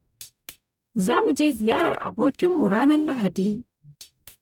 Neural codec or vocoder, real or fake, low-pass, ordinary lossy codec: codec, 44.1 kHz, 0.9 kbps, DAC; fake; 19.8 kHz; none